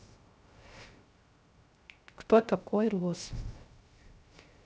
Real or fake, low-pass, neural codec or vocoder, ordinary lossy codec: fake; none; codec, 16 kHz, 0.3 kbps, FocalCodec; none